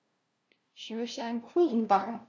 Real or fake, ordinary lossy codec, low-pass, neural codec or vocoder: fake; none; none; codec, 16 kHz, 0.5 kbps, FunCodec, trained on LibriTTS, 25 frames a second